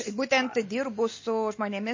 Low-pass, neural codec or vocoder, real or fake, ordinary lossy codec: 7.2 kHz; none; real; MP3, 32 kbps